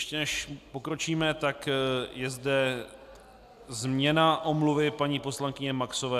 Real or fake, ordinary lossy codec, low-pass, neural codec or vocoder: real; AAC, 96 kbps; 14.4 kHz; none